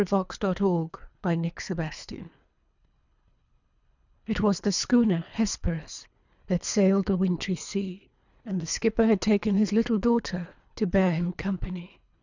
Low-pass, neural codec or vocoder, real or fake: 7.2 kHz; codec, 24 kHz, 3 kbps, HILCodec; fake